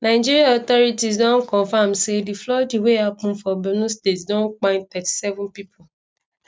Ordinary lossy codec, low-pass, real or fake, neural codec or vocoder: none; none; real; none